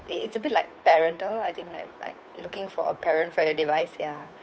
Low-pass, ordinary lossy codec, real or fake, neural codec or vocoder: none; none; fake; codec, 16 kHz, 2 kbps, FunCodec, trained on Chinese and English, 25 frames a second